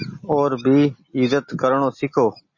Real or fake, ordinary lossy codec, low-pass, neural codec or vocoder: real; MP3, 32 kbps; 7.2 kHz; none